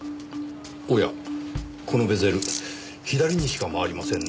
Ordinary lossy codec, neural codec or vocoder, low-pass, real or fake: none; none; none; real